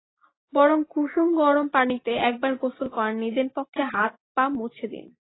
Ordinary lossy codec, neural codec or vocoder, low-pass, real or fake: AAC, 16 kbps; none; 7.2 kHz; real